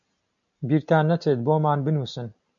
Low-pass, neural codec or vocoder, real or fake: 7.2 kHz; none; real